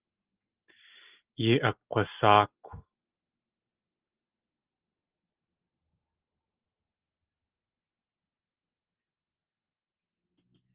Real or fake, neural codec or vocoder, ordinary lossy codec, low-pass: real; none; Opus, 32 kbps; 3.6 kHz